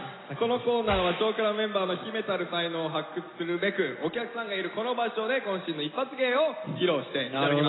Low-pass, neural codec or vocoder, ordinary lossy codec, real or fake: 7.2 kHz; none; AAC, 16 kbps; real